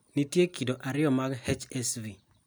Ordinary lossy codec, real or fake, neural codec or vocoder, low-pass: none; real; none; none